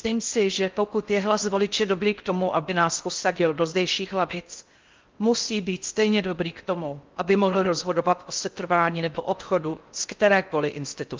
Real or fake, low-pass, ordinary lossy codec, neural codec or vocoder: fake; 7.2 kHz; Opus, 32 kbps; codec, 16 kHz in and 24 kHz out, 0.6 kbps, FocalCodec, streaming, 4096 codes